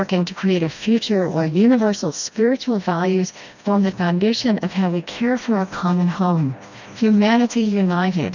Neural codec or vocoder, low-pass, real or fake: codec, 16 kHz, 1 kbps, FreqCodec, smaller model; 7.2 kHz; fake